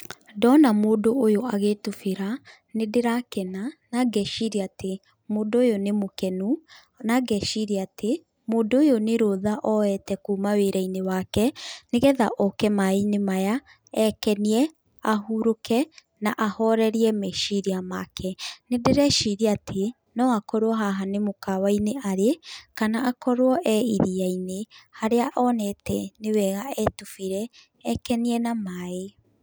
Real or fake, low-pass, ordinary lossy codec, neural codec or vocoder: real; none; none; none